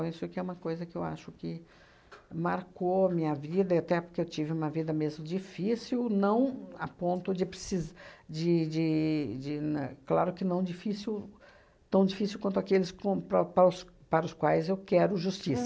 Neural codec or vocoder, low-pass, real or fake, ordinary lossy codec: none; none; real; none